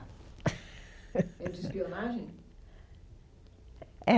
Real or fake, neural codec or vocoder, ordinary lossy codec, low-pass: real; none; none; none